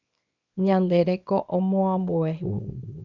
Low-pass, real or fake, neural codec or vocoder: 7.2 kHz; fake; codec, 24 kHz, 0.9 kbps, WavTokenizer, small release